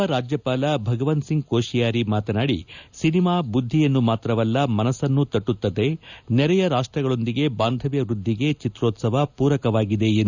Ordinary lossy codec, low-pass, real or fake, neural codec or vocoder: none; 7.2 kHz; real; none